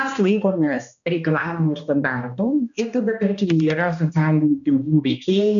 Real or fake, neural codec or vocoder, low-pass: fake; codec, 16 kHz, 1 kbps, X-Codec, HuBERT features, trained on balanced general audio; 7.2 kHz